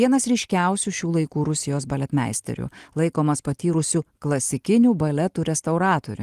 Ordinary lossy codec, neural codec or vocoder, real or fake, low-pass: Opus, 24 kbps; none; real; 14.4 kHz